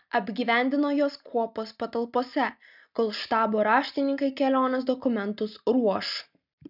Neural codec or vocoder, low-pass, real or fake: none; 5.4 kHz; real